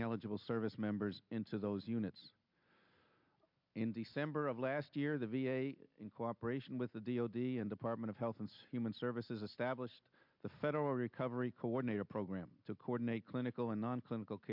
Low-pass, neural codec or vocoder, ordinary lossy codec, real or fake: 5.4 kHz; none; MP3, 48 kbps; real